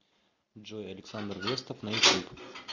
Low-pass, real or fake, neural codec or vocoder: 7.2 kHz; real; none